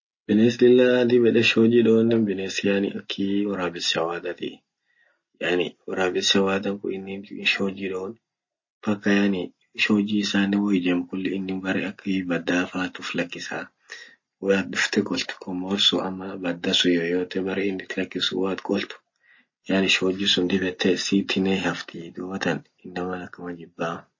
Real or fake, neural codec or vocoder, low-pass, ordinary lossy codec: real; none; 7.2 kHz; MP3, 32 kbps